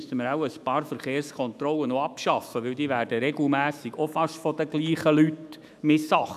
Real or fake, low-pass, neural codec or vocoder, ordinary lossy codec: fake; 14.4 kHz; autoencoder, 48 kHz, 128 numbers a frame, DAC-VAE, trained on Japanese speech; none